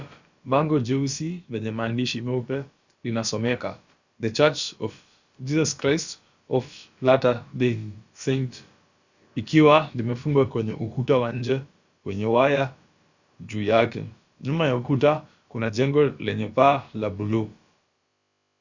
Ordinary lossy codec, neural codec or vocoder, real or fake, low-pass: Opus, 64 kbps; codec, 16 kHz, about 1 kbps, DyCAST, with the encoder's durations; fake; 7.2 kHz